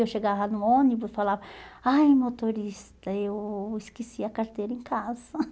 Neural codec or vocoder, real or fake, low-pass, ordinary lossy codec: none; real; none; none